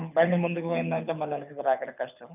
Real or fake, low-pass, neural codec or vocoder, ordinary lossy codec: fake; 3.6 kHz; codec, 24 kHz, 6 kbps, HILCodec; none